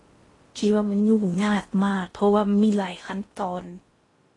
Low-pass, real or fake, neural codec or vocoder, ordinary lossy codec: 10.8 kHz; fake; codec, 16 kHz in and 24 kHz out, 0.6 kbps, FocalCodec, streaming, 4096 codes; AAC, 32 kbps